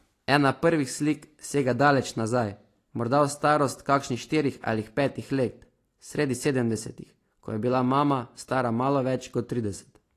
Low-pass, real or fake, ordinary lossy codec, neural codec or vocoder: 14.4 kHz; real; AAC, 48 kbps; none